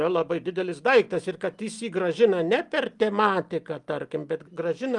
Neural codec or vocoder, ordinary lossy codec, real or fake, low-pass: none; Opus, 32 kbps; real; 10.8 kHz